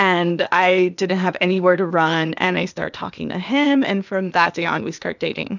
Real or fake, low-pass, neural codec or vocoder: fake; 7.2 kHz; codec, 16 kHz, 0.8 kbps, ZipCodec